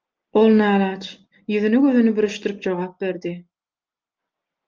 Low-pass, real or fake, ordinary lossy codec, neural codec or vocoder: 7.2 kHz; real; Opus, 24 kbps; none